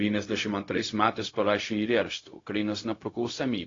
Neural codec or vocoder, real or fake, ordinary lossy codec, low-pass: codec, 16 kHz, 0.4 kbps, LongCat-Audio-Codec; fake; AAC, 32 kbps; 7.2 kHz